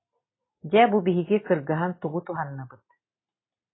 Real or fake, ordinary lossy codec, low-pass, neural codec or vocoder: real; AAC, 16 kbps; 7.2 kHz; none